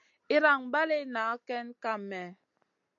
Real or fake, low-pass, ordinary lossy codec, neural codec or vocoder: real; 7.2 kHz; AAC, 64 kbps; none